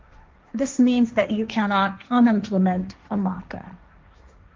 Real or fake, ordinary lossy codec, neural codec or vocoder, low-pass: fake; Opus, 24 kbps; codec, 16 kHz, 1.1 kbps, Voila-Tokenizer; 7.2 kHz